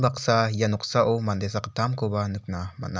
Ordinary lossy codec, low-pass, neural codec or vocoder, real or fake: none; none; none; real